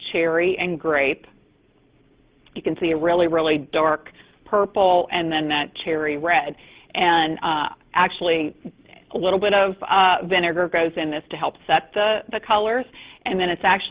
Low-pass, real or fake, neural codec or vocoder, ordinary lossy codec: 3.6 kHz; real; none; Opus, 16 kbps